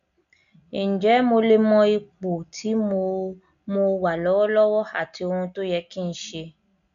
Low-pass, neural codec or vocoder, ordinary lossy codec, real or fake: 7.2 kHz; none; none; real